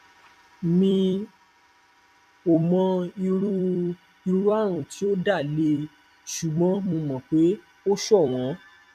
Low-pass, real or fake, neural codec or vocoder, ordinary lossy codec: 14.4 kHz; fake; vocoder, 44.1 kHz, 128 mel bands every 256 samples, BigVGAN v2; none